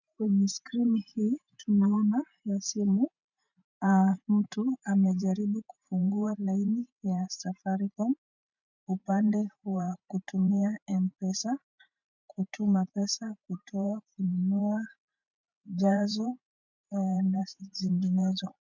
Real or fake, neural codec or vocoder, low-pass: fake; vocoder, 44.1 kHz, 128 mel bands every 512 samples, BigVGAN v2; 7.2 kHz